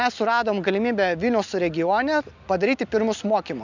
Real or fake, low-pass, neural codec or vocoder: real; 7.2 kHz; none